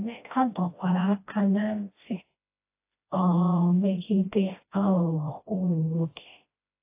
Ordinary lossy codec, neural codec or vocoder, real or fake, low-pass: AAC, 24 kbps; codec, 16 kHz, 1 kbps, FreqCodec, smaller model; fake; 3.6 kHz